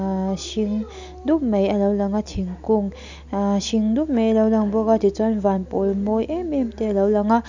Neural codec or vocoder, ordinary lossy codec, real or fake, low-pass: none; none; real; 7.2 kHz